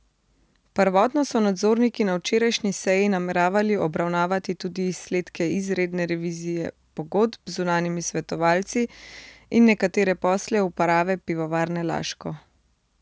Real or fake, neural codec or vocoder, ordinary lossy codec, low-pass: real; none; none; none